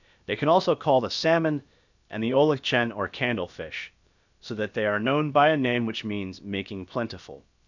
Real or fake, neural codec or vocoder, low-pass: fake; codec, 16 kHz, about 1 kbps, DyCAST, with the encoder's durations; 7.2 kHz